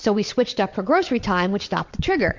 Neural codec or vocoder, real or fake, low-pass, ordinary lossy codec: vocoder, 22.05 kHz, 80 mel bands, WaveNeXt; fake; 7.2 kHz; MP3, 64 kbps